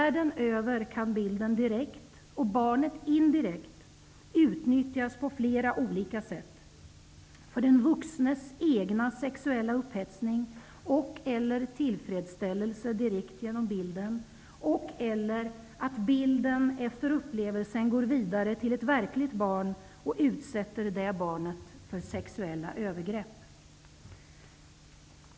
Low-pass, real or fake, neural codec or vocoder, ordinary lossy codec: none; real; none; none